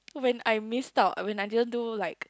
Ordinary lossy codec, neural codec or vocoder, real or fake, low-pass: none; none; real; none